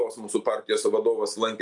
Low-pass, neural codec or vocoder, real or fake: 10.8 kHz; none; real